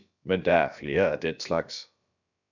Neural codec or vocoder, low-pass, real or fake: codec, 16 kHz, about 1 kbps, DyCAST, with the encoder's durations; 7.2 kHz; fake